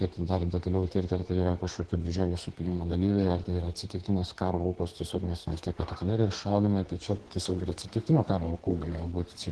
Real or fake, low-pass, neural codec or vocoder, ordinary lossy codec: fake; 10.8 kHz; codec, 32 kHz, 1.9 kbps, SNAC; Opus, 16 kbps